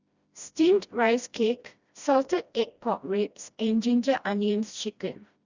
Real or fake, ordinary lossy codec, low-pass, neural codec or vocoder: fake; Opus, 64 kbps; 7.2 kHz; codec, 16 kHz, 1 kbps, FreqCodec, smaller model